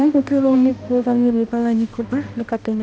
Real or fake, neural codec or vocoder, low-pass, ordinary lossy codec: fake; codec, 16 kHz, 1 kbps, X-Codec, HuBERT features, trained on balanced general audio; none; none